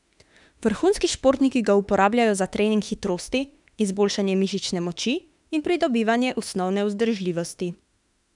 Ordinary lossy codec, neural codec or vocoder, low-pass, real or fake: none; autoencoder, 48 kHz, 32 numbers a frame, DAC-VAE, trained on Japanese speech; 10.8 kHz; fake